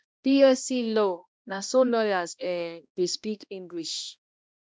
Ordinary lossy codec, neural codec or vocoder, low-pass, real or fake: none; codec, 16 kHz, 1 kbps, X-Codec, HuBERT features, trained on balanced general audio; none; fake